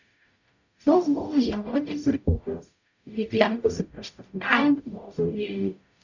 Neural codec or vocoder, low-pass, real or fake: codec, 44.1 kHz, 0.9 kbps, DAC; 7.2 kHz; fake